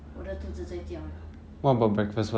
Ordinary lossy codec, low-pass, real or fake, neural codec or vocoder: none; none; real; none